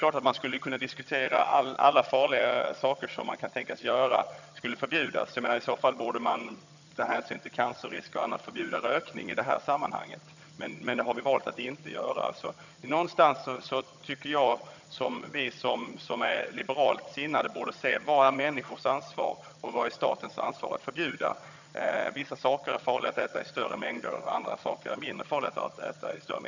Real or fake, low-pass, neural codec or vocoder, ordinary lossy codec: fake; 7.2 kHz; vocoder, 22.05 kHz, 80 mel bands, HiFi-GAN; none